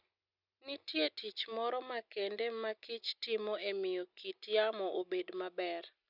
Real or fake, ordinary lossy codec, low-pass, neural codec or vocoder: real; none; 5.4 kHz; none